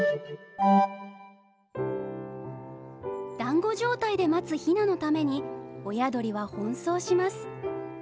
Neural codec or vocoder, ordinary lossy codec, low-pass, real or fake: none; none; none; real